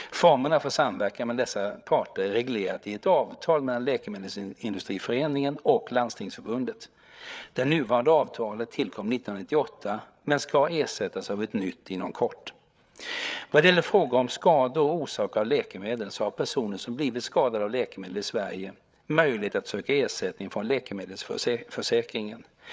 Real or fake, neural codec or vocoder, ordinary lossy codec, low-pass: fake; codec, 16 kHz, 8 kbps, FreqCodec, larger model; none; none